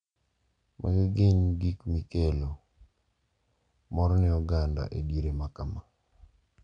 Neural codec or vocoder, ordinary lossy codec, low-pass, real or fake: none; none; 9.9 kHz; real